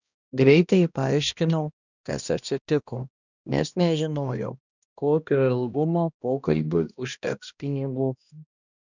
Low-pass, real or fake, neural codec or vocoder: 7.2 kHz; fake; codec, 16 kHz, 1 kbps, X-Codec, HuBERT features, trained on balanced general audio